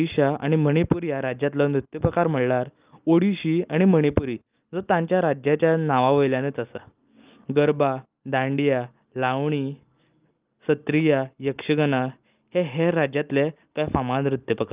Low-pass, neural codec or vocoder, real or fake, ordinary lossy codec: 3.6 kHz; none; real; Opus, 24 kbps